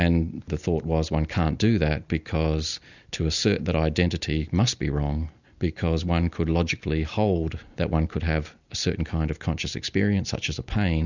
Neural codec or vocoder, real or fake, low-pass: none; real; 7.2 kHz